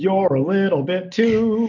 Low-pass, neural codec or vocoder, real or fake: 7.2 kHz; none; real